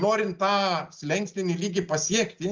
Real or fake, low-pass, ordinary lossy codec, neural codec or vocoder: real; 7.2 kHz; Opus, 32 kbps; none